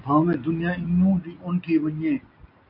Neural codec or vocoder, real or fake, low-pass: none; real; 5.4 kHz